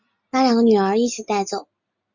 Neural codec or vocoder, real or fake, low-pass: none; real; 7.2 kHz